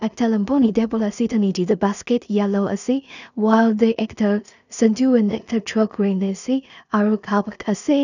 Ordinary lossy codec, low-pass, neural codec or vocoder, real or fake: none; 7.2 kHz; codec, 16 kHz in and 24 kHz out, 0.4 kbps, LongCat-Audio-Codec, two codebook decoder; fake